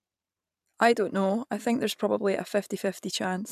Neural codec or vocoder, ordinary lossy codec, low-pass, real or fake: vocoder, 44.1 kHz, 128 mel bands every 512 samples, BigVGAN v2; none; 14.4 kHz; fake